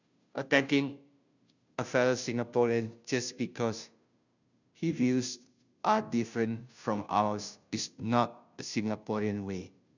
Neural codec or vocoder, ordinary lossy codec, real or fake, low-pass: codec, 16 kHz, 0.5 kbps, FunCodec, trained on Chinese and English, 25 frames a second; none; fake; 7.2 kHz